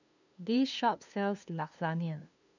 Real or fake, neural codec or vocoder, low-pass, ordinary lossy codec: fake; codec, 16 kHz, 2 kbps, FunCodec, trained on LibriTTS, 25 frames a second; 7.2 kHz; none